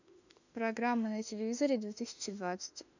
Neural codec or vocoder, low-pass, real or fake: autoencoder, 48 kHz, 32 numbers a frame, DAC-VAE, trained on Japanese speech; 7.2 kHz; fake